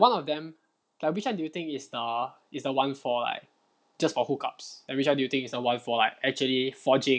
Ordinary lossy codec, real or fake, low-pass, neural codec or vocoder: none; real; none; none